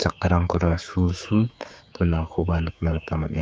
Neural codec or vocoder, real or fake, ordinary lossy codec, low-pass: codec, 16 kHz, 4 kbps, X-Codec, HuBERT features, trained on general audio; fake; none; none